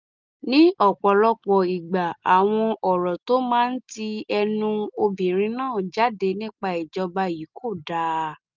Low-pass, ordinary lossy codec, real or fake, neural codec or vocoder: 7.2 kHz; Opus, 32 kbps; real; none